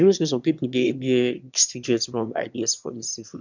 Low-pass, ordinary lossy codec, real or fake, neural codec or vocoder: 7.2 kHz; none; fake; autoencoder, 22.05 kHz, a latent of 192 numbers a frame, VITS, trained on one speaker